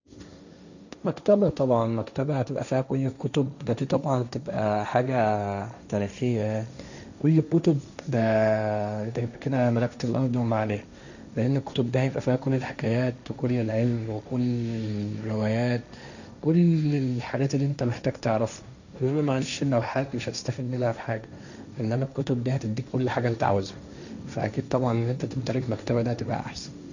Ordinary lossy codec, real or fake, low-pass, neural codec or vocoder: none; fake; 7.2 kHz; codec, 16 kHz, 1.1 kbps, Voila-Tokenizer